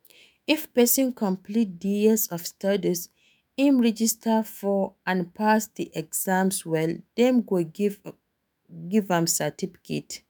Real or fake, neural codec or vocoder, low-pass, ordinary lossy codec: fake; autoencoder, 48 kHz, 128 numbers a frame, DAC-VAE, trained on Japanese speech; none; none